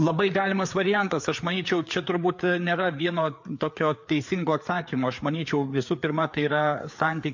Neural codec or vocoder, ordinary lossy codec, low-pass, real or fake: codec, 16 kHz, 4 kbps, FreqCodec, larger model; MP3, 48 kbps; 7.2 kHz; fake